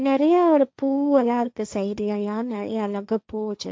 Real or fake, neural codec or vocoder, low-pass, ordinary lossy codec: fake; codec, 16 kHz, 1.1 kbps, Voila-Tokenizer; none; none